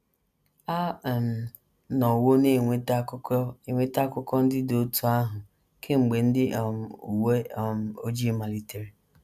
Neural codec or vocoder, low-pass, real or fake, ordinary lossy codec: none; 14.4 kHz; real; none